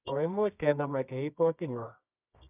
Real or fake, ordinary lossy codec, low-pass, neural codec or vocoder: fake; none; 3.6 kHz; codec, 24 kHz, 0.9 kbps, WavTokenizer, medium music audio release